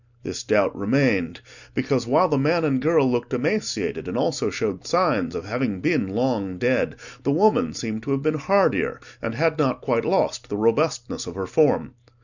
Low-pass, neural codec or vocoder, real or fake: 7.2 kHz; none; real